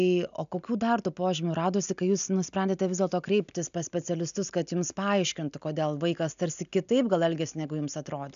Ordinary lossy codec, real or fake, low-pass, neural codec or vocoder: AAC, 96 kbps; real; 7.2 kHz; none